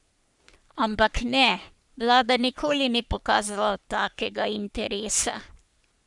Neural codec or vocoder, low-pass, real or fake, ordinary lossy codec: codec, 44.1 kHz, 3.4 kbps, Pupu-Codec; 10.8 kHz; fake; none